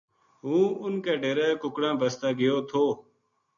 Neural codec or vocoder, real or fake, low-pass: none; real; 7.2 kHz